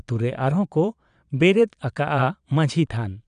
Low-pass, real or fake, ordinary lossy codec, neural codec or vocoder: 9.9 kHz; fake; none; vocoder, 22.05 kHz, 80 mel bands, Vocos